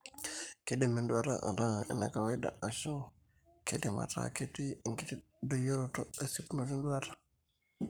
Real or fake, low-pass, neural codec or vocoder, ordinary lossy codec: fake; none; codec, 44.1 kHz, 7.8 kbps, Pupu-Codec; none